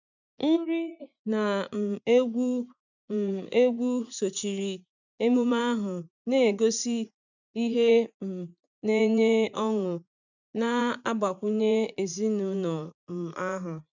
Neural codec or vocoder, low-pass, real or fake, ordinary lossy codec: vocoder, 44.1 kHz, 80 mel bands, Vocos; 7.2 kHz; fake; none